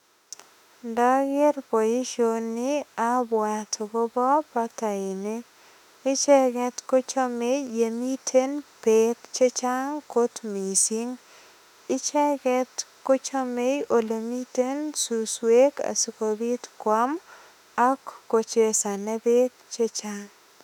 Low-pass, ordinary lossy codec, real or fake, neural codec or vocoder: 19.8 kHz; none; fake; autoencoder, 48 kHz, 32 numbers a frame, DAC-VAE, trained on Japanese speech